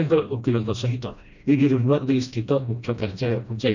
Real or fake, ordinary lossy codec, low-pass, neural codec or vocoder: fake; none; 7.2 kHz; codec, 16 kHz, 1 kbps, FreqCodec, smaller model